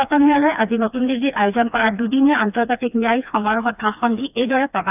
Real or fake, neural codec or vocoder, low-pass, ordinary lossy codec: fake; codec, 16 kHz, 2 kbps, FreqCodec, smaller model; 3.6 kHz; none